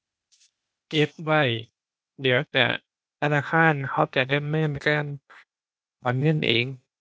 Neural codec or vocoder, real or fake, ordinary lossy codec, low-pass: codec, 16 kHz, 0.8 kbps, ZipCodec; fake; none; none